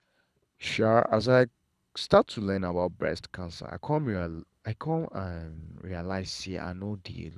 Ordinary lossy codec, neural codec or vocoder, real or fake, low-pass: none; codec, 24 kHz, 6 kbps, HILCodec; fake; none